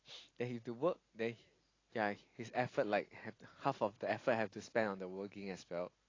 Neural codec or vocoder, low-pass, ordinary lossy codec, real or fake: none; 7.2 kHz; AAC, 32 kbps; real